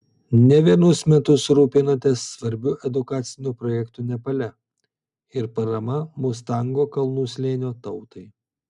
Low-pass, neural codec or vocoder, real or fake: 10.8 kHz; none; real